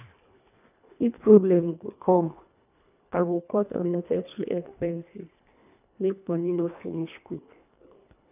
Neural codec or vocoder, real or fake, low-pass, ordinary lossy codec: codec, 24 kHz, 1.5 kbps, HILCodec; fake; 3.6 kHz; none